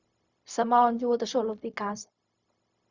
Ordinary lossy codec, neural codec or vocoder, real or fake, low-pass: Opus, 64 kbps; codec, 16 kHz, 0.4 kbps, LongCat-Audio-Codec; fake; 7.2 kHz